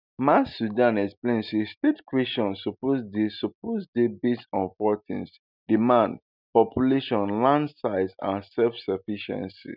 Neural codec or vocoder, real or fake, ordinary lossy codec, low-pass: none; real; none; 5.4 kHz